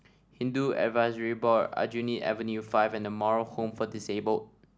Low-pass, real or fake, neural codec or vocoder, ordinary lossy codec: none; real; none; none